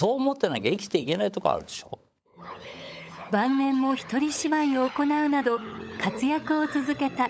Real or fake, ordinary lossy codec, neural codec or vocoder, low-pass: fake; none; codec, 16 kHz, 16 kbps, FunCodec, trained on LibriTTS, 50 frames a second; none